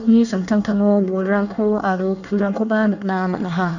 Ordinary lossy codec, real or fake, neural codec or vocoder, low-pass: none; fake; codec, 24 kHz, 1 kbps, SNAC; 7.2 kHz